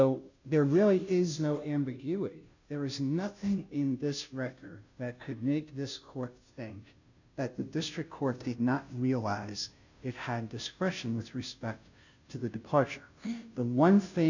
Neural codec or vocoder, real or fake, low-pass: codec, 16 kHz, 0.5 kbps, FunCodec, trained on Chinese and English, 25 frames a second; fake; 7.2 kHz